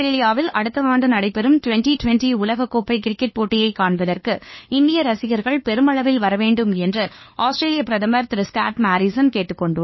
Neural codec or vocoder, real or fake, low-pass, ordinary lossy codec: codec, 16 kHz, 2 kbps, FunCodec, trained on LibriTTS, 25 frames a second; fake; 7.2 kHz; MP3, 24 kbps